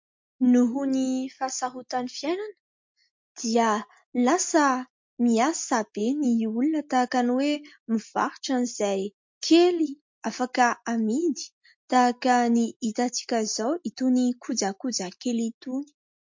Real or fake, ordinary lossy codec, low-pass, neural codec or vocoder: real; MP3, 48 kbps; 7.2 kHz; none